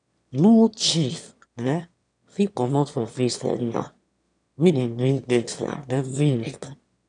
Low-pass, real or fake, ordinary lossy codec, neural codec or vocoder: 9.9 kHz; fake; none; autoencoder, 22.05 kHz, a latent of 192 numbers a frame, VITS, trained on one speaker